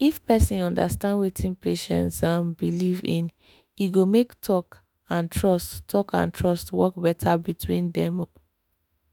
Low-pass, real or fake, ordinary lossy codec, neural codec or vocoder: none; fake; none; autoencoder, 48 kHz, 32 numbers a frame, DAC-VAE, trained on Japanese speech